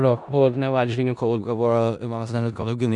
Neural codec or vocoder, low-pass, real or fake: codec, 16 kHz in and 24 kHz out, 0.4 kbps, LongCat-Audio-Codec, four codebook decoder; 10.8 kHz; fake